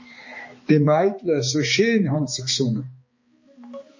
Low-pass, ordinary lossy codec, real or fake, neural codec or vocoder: 7.2 kHz; MP3, 32 kbps; fake; codec, 16 kHz, 2 kbps, X-Codec, HuBERT features, trained on balanced general audio